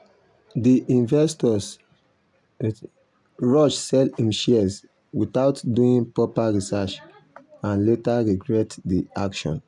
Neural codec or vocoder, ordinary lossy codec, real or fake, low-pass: none; none; real; 10.8 kHz